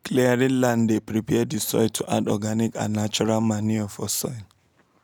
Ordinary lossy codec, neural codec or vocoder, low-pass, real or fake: none; none; none; real